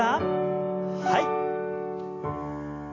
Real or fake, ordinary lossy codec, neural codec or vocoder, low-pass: real; AAC, 32 kbps; none; 7.2 kHz